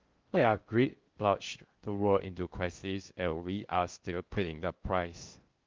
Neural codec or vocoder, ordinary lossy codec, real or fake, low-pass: codec, 16 kHz in and 24 kHz out, 0.6 kbps, FocalCodec, streaming, 2048 codes; Opus, 24 kbps; fake; 7.2 kHz